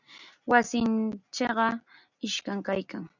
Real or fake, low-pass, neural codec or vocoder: real; 7.2 kHz; none